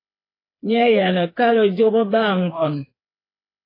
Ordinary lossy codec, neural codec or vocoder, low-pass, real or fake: AAC, 24 kbps; codec, 16 kHz, 4 kbps, FreqCodec, smaller model; 5.4 kHz; fake